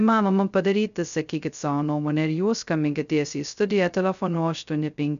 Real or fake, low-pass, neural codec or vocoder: fake; 7.2 kHz; codec, 16 kHz, 0.2 kbps, FocalCodec